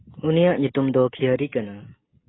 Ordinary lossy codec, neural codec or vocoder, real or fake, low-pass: AAC, 16 kbps; none; real; 7.2 kHz